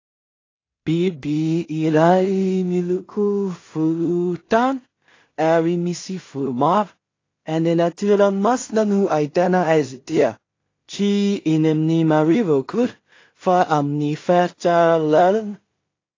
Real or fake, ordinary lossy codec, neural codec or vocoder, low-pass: fake; AAC, 32 kbps; codec, 16 kHz in and 24 kHz out, 0.4 kbps, LongCat-Audio-Codec, two codebook decoder; 7.2 kHz